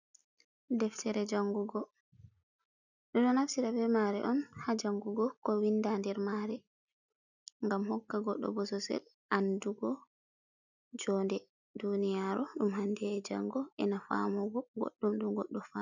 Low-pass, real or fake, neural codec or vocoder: 7.2 kHz; real; none